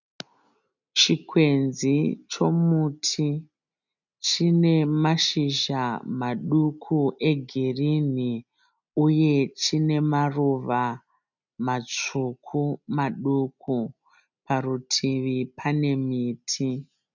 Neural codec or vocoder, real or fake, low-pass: none; real; 7.2 kHz